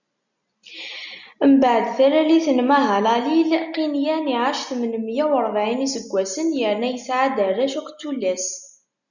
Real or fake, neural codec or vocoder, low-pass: real; none; 7.2 kHz